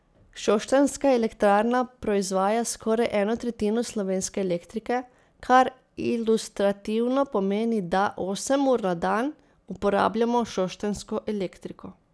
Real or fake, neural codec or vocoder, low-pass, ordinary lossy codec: real; none; none; none